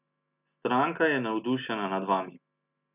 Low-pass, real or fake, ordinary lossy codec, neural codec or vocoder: 3.6 kHz; real; none; none